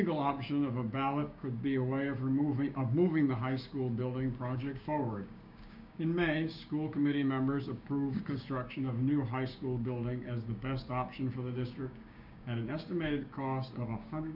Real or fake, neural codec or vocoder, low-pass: fake; codec, 16 kHz, 6 kbps, DAC; 5.4 kHz